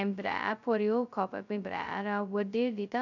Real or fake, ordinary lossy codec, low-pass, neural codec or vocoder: fake; none; 7.2 kHz; codec, 16 kHz, 0.2 kbps, FocalCodec